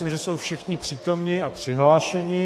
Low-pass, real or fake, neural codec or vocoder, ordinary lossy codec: 14.4 kHz; fake; codec, 32 kHz, 1.9 kbps, SNAC; AAC, 64 kbps